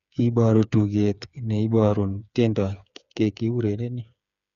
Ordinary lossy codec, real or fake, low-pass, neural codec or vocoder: none; fake; 7.2 kHz; codec, 16 kHz, 8 kbps, FreqCodec, smaller model